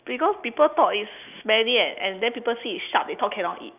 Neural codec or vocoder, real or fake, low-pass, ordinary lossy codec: none; real; 3.6 kHz; none